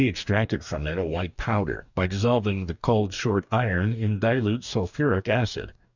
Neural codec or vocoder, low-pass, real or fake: codec, 44.1 kHz, 2.6 kbps, DAC; 7.2 kHz; fake